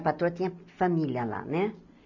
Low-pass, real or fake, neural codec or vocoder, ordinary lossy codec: 7.2 kHz; real; none; none